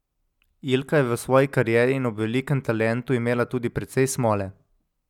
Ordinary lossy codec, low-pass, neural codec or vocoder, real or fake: none; 19.8 kHz; none; real